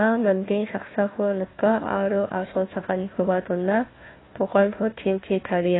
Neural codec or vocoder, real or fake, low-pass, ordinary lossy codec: codec, 16 kHz, 1 kbps, FunCodec, trained on Chinese and English, 50 frames a second; fake; 7.2 kHz; AAC, 16 kbps